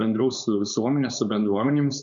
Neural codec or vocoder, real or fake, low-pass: codec, 16 kHz, 4.8 kbps, FACodec; fake; 7.2 kHz